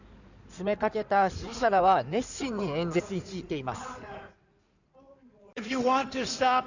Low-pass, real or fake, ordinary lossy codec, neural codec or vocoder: 7.2 kHz; fake; none; codec, 16 kHz in and 24 kHz out, 2.2 kbps, FireRedTTS-2 codec